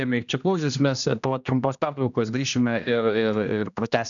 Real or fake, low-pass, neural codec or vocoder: fake; 7.2 kHz; codec, 16 kHz, 1 kbps, X-Codec, HuBERT features, trained on general audio